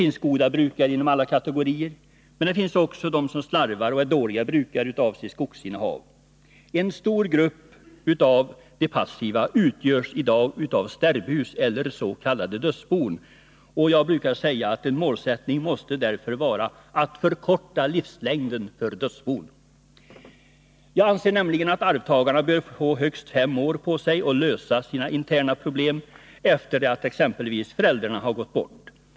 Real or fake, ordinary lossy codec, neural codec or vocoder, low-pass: real; none; none; none